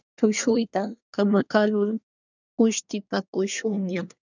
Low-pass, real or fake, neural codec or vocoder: 7.2 kHz; fake; codec, 24 kHz, 1 kbps, SNAC